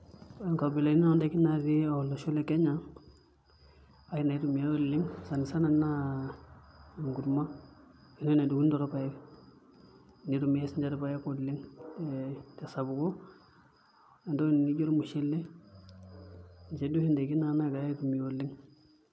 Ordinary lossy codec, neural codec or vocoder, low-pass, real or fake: none; none; none; real